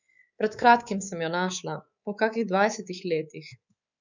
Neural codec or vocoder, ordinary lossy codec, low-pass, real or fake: codec, 24 kHz, 3.1 kbps, DualCodec; none; 7.2 kHz; fake